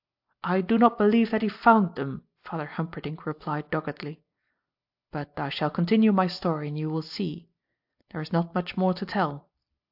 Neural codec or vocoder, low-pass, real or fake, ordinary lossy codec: none; 5.4 kHz; real; AAC, 48 kbps